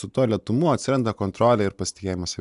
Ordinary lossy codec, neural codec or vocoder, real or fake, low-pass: AAC, 96 kbps; none; real; 10.8 kHz